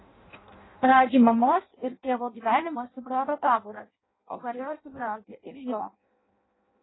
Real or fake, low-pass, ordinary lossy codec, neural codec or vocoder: fake; 7.2 kHz; AAC, 16 kbps; codec, 16 kHz in and 24 kHz out, 0.6 kbps, FireRedTTS-2 codec